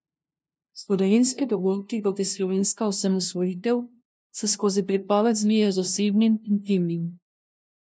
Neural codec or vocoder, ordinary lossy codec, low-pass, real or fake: codec, 16 kHz, 0.5 kbps, FunCodec, trained on LibriTTS, 25 frames a second; none; none; fake